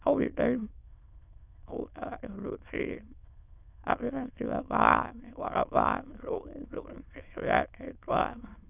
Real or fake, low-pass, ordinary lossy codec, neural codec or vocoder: fake; 3.6 kHz; none; autoencoder, 22.05 kHz, a latent of 192 numbers a frame, VITS, trained on many speakers